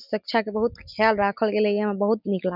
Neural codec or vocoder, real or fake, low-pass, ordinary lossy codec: none; real; 5.4 kHz; none